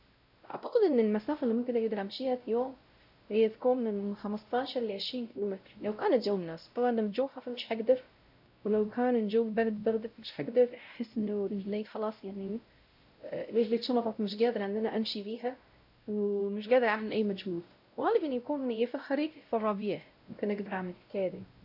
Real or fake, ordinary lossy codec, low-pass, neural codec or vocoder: fake; none; 5.4 kHz; codec, 16 kHz, 0.5 kbps, X-Codec, WavLM features, trained on Multilingual LibriSpeech